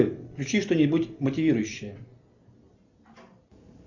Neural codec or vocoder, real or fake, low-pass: none; real; 7.2 kHz